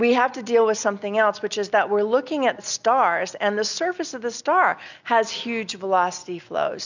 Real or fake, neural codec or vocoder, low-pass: real; none; 7.2 kHz